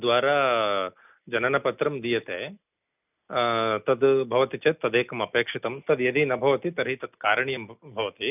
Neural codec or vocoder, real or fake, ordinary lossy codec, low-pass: none; real; none; 3.6 kHz